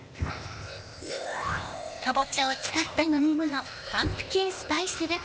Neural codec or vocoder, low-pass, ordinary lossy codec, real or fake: codec, 16 kHz, 0.8 kbps, ZipCodec; none; none; fake